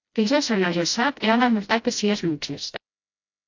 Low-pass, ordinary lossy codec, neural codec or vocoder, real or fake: 7.2 kHz; AAC, 48 kbps; codec, 16 kHz, 0.5 kbps, FreqCodec, smaller model; fake